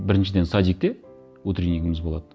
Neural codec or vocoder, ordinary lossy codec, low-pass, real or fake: none; none; none; real